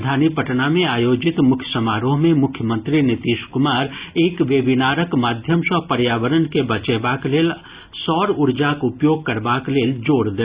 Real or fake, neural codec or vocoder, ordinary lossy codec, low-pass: real; none; Opus, 64 kbps; 3.6 kHz